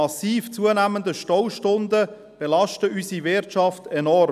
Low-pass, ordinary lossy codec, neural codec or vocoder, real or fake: 14.4 kHz; none; none; real